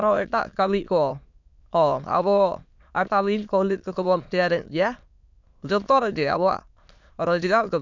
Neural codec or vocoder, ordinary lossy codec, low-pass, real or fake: autoencoder, 22.05 kHz, a latent of 192 numbers a frame, VITS, trained on many speakers; none; 7.2 kHz; fake